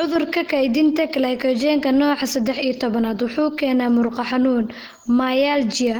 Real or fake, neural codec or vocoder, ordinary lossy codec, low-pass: real; none; Opus, 16 kbps; 19.8 kHz